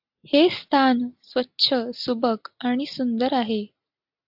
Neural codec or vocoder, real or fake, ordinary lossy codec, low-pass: none; real; AAC, 48 kbps; 5.4 kHz